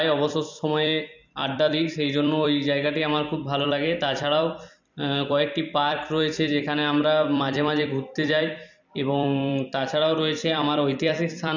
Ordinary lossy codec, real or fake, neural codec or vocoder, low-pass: none; fake; vocoder, 44.1 kHz, 128 mel bands every 256 samples, BigVGAN v2; 7.2 kHz